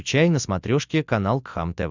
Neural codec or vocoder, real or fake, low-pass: none; real; 7.2 kHz